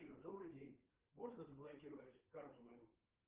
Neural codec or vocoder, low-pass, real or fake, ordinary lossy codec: codec, 16 kHz, 4 kbps, FreqCodec, larger model; 3.6 kHz; fake; Opus, 16 kbps